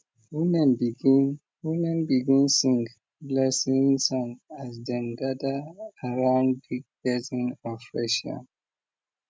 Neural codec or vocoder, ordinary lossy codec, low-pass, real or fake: none; none; none; real